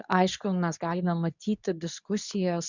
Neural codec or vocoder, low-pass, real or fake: codec, 24 kHz, 0.9 kbps, WavTokenizer, medium speech release version 2; 7.2 kHz; fake